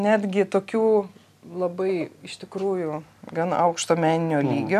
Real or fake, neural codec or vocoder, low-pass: real; none; 14.4 kHz